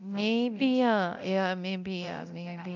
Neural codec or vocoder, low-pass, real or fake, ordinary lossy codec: codec, 24 kHz, 0.9 kbps, DualCodec; 7.2 kHz; fake; none